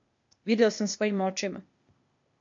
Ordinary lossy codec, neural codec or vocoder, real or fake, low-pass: MP3, 48 kbps; codec, 16 kHz, 0.8 kbps, ZipCodec; fake; 7.2 kHz